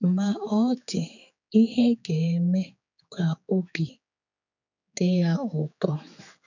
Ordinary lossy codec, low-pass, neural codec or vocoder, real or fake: none; 7.2 kHz; codec, 16 kHz, 4 kbps, X-Codec, HuBERT features, trained on general audio; fake